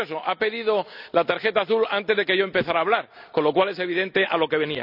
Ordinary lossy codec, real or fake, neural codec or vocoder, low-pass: none; real; none; 5.4 kHz